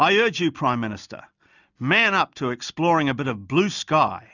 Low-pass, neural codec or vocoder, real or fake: 7.2 kHz; none; real